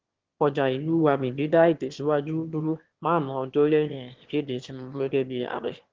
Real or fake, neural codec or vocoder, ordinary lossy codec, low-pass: fake; autoencoder, 22.05 kHz, a latent of 192 numbers a frame, VITS, trained on one speaker; Opus, 16 kbps; 7.2 kHz